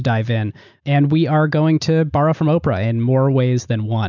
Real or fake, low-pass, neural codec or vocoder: real; 7.2 kHz; none